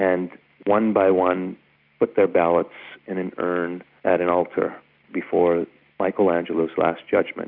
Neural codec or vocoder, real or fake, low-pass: none; real; 5.4 kHz